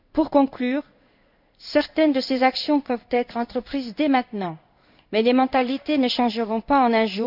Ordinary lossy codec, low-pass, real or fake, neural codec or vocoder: none; 5.4 kHz; fake; codec, 16 kHz in and 24 kHz out, 1 kbps, XY-Tokenizer